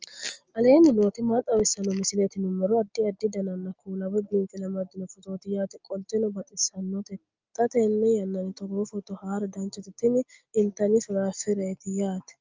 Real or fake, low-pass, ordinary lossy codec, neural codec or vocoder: real; 7.2 kHz; Opus, 24 kbps; none